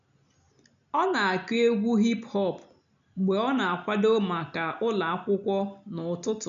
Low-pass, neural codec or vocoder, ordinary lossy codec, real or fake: 7.2 kHz; none; none; real